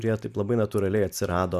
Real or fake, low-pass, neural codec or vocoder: real; 14.4 kHz; none